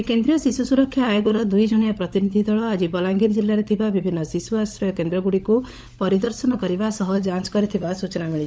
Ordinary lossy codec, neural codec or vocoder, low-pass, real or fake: none; codec, 16 kHz, 16 kbps, FunCodec, trained on LibriTTS, 50 frames a second; none; fake